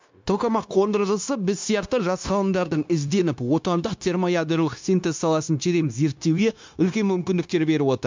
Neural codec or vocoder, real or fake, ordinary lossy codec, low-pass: codec, 16 kHz, 0.9 kbps, LongCat-Audio-Codec; fake; MP3, 64 kbps; 7.2 kHz